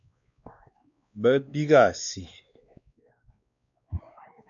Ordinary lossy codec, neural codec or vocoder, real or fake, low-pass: AAC, 64 kbps; codec, 16 kHz, 2 kbps, X-Codec, WavLM features, trained on Multilingual LibriSpeech; fake; 7.2 kHz